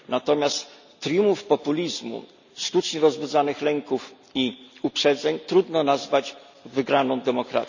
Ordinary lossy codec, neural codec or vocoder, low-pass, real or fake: none; none; 7.2 kHz; real